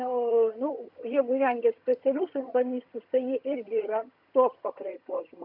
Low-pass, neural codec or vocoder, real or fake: 5.4 kHz; vocoder, 22.05 kHz, 80 mel bands, HiFi-GAN; fake